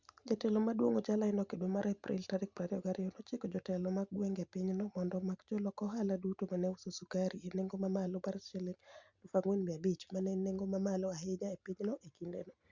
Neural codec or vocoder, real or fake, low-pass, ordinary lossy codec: none; real; 7.2 kHz; Opus, 64 kbps